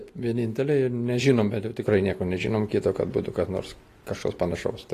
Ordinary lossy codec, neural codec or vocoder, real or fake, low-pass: AAC, 48 kbps; none; real; 14.4 kHz